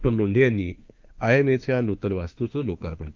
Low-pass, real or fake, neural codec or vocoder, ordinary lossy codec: 7.2 kHz; fake; autoencoder, 48 kHz, 32 numbers a frame, DAC-VAE, trained on Japanese speech; Opus, 32 kbps